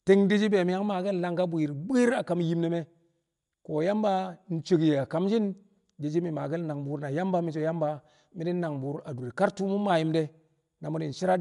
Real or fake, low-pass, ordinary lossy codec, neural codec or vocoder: real; 10.8 kHz; MP3, 96 kbps; none